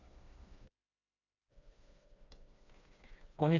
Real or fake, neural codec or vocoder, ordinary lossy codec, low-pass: fake; codec, 16 kHz, 2 kbps, FreqCodec, smaller model; none; 7.2 kHz